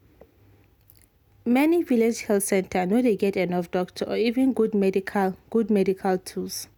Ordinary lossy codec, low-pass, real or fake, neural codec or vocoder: none; 19.8 kHz; real; none